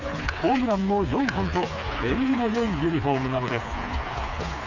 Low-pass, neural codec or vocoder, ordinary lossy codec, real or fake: 7.2 kHz; codec, 16 kHz, 4 kbps, FreqCodec, smaller model; none; fake